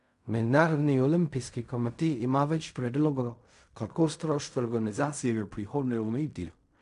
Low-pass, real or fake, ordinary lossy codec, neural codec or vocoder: 10.8 kHz; fake; none; codec, 16 kHz in and 24 kHz out, 0.4 kbps, LongCat-Audio-Codec, fine tuned four codebook decoder